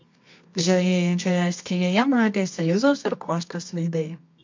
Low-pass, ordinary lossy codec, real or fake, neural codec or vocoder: 7.2 kHz; MP3, 48 kbps; fake; codec, 24 kHz, 0.9 kbps, WavTokenizer, medium music audio release